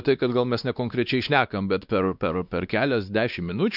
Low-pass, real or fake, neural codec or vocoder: 5.4 kHz; fake; codec, 16 kHz, 2 kbps, X-Codec, WavLM features, trained on Multilingual LibriSpeech